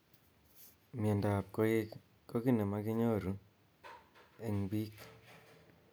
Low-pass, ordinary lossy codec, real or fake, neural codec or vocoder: none; none; real; none